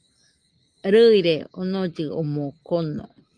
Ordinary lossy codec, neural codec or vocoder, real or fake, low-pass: Opus, 32 kbps; none; real; 9.9 kHz